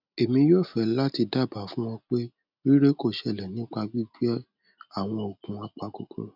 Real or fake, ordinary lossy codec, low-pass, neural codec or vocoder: real; none; 5.4 kHz; none